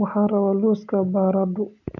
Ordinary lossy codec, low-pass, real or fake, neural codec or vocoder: none; 7.2 kHz; real; none